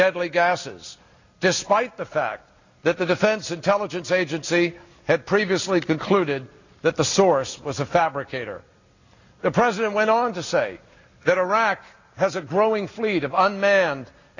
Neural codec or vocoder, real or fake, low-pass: none; real; 7.2 kHz